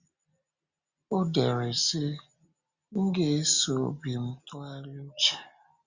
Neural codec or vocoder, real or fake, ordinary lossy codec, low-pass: none; real; Opus, 64 kbps; 7.2 kHz